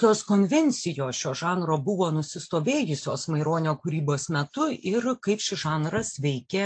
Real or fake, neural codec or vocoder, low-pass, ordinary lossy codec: real; none; 9.9 kHz; AAC, 64 kbps